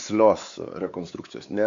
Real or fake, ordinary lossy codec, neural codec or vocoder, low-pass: fake; Opus, 64 kbps; codec, 16 kHz, 4 kbps, X-Codec, WavLM features, trained on Multilingual LibriSpeech; 7.2 kHz